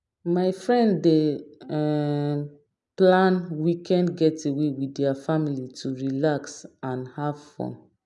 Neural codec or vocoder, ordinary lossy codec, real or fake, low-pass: none; none; real; 10.8 kHz